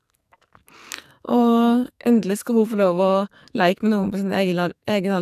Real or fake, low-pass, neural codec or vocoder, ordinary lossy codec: fake; 14.4 kHz; codec, 44.1 kHz, 2.6 kbps, SNAC; none